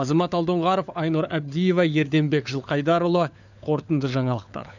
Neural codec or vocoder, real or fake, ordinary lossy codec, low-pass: codec, 16 kHz, 4 kbps, FunCodec, trained on LibriTTS, 50 frames a second; fake; none; 7.2 kHz